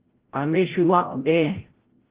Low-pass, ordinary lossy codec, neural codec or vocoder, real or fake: 3.6 kHz; Opus, 16 kbps; codec, 16 kHz, 0.5 kbps, FreqCodec, larger model; fake